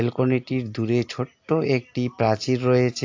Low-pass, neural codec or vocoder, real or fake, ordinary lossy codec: 7.2 kHz; none; real; AAC, 48 kbps